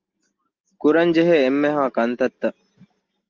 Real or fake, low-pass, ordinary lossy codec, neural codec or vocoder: real; 7.2 kHz; Opus, 24 kbps; none